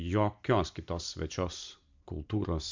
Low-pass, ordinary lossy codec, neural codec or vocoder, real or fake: 7.2 kHz; MP3, 64 kbps; none; real